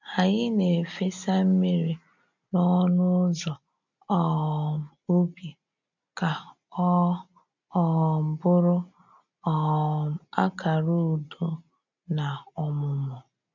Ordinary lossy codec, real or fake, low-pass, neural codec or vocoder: none; real; 7.2 kHz; none